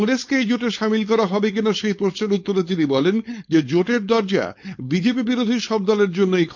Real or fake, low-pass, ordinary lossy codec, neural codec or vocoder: fake; 7.2 kHz; MP3, 48 kbps; codec, 16 kHz, 4.8 kbps, FACodec